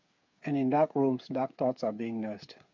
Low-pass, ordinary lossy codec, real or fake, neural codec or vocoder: 7.2 kHz; MP3, 48 kbps; fake; codec, 16 kHz, 8 kbps, FreqCodec, smaller model